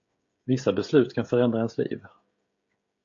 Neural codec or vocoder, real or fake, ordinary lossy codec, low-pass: codec, 16 kHz, 16 kbps, FreqCodec, smaller model; fake; AAC, 48 kbps; 7.2 kHz